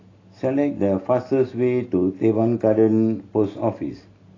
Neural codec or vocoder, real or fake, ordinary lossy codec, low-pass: none; real; AAC, 32 kbps; 7.2 kHz